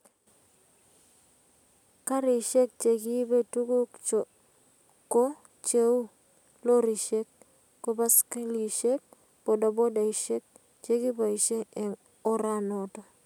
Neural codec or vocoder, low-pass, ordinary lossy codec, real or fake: none; 19.8 kHz; none; real